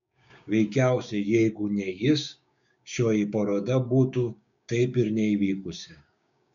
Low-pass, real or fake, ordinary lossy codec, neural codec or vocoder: 7.2 kHz; fake; MP3, 96 kbps; codec, 16 kHz, 6 kbps, DAC